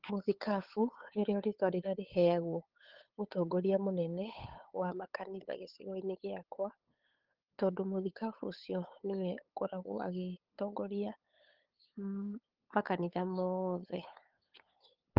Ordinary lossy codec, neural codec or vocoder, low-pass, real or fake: Opus, 16 kbps; codec, 16 kHz, 8 kbps, FunCodec, trained on LibriTTS, 25 frames a second; 5.4 kHz; fake